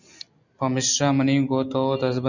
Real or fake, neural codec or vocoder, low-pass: real; none; 7.2 kHz